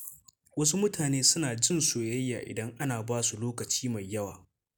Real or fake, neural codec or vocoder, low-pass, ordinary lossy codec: real; none; none; none